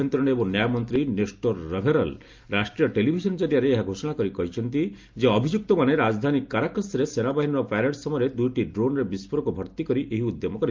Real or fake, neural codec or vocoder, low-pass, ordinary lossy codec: real; none; 7.2 kHz; Opus, 24 kbps